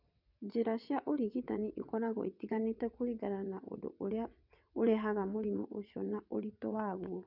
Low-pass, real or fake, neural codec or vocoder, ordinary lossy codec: 5.4 kHz; fake; vocoder, 22.05 kHz, 80 mel bands, WaveNeXt; none